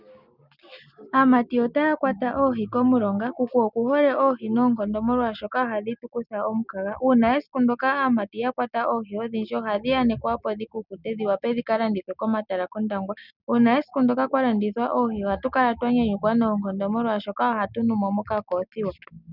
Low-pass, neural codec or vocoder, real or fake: 5.4 kHz; none; real